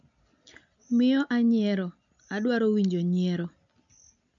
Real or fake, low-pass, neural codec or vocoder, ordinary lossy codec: real; 7.2 kHz; none; MP3, 64 kbps